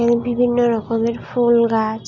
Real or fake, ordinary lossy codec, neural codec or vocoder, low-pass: real; none; none; 7.2 kHz